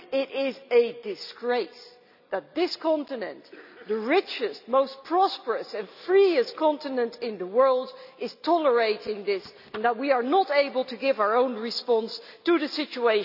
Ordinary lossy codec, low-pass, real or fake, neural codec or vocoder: none; 5.4 kHz; real; none